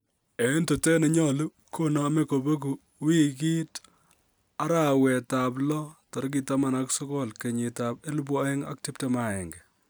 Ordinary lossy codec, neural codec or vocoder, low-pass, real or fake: none; none; none; real